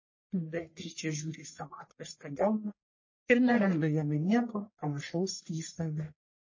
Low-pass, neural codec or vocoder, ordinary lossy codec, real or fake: 7.2 kHz; codec, 44.1 kHz, 1.7 kbps, Pupu-Codec; MP3, 32 kbps; fake